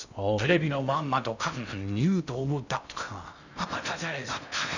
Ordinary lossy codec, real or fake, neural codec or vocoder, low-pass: none; fake; codec, 16 kHz in and 24 kHz out, 0.6 kbps, FocalCodec, streaming, 2048 codes; 7.2 kHz